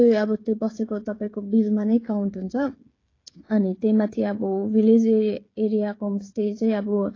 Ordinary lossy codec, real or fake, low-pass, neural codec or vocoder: AAC, 32 kbps; fake; 7.2 kHz; codec, 16 kHz, 16 kbps, FreqCodec, smaller model